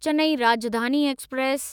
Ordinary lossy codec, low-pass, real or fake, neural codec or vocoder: none; 19.8 kHz; fake; autoencoder, 48 kHz, 128 numbers a frame, DAC-VAE, trained on Japanese speech